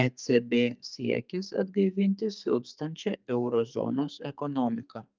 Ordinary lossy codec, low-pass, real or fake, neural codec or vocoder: Opus, 24 kbps; 7.2 kHz; fake; codec, 32 kHz, 1.9 kbps, SNAC